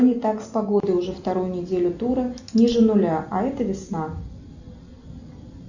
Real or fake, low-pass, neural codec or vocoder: real; 7.2 kHz; none